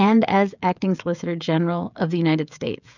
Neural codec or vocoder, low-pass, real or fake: codec, 16 kHz, 16 kbps, FreqCodec, smaller model; 7.2 kHz; fake